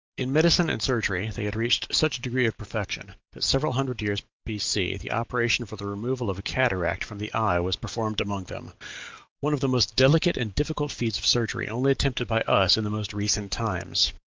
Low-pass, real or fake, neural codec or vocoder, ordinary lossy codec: 7.2 kHz; real; none; Opus, 24 kbps